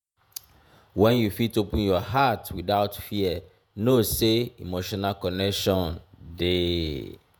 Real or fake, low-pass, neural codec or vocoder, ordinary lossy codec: fake; none; vocoder, 48 kHz, 128 mel bands, Vocos; none